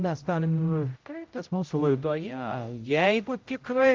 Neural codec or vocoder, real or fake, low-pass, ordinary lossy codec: codec, 16 kHz, 0.5 kbps, X-Codec, HuBERT features, trained on general audio; fake; 7.2 kHz; Opus, 24 kbps